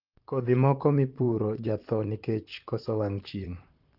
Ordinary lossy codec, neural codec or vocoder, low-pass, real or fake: Opus, 32 kbps; vocoder, 44.1 kHz, 128 mel bands, Pupu-Vocoder; 5.4 kHz; fake